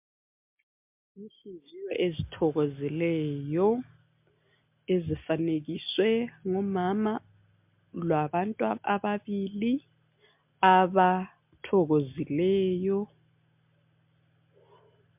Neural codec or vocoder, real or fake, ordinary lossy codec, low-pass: none; real; MP3, 32 kbps; 3.6 kHz